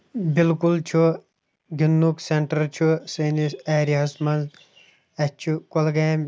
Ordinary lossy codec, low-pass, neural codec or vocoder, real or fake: none; none; none; real